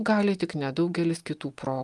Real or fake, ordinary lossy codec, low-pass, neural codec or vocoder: real; Opus, 32 kbps; 10.8 kHz; none